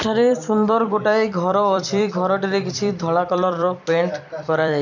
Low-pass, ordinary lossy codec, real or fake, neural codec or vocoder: 7.2 kHz; none; fake; vocoder, 44.1 kHz, 128 mel bands every 256 samples, BigVGAN v2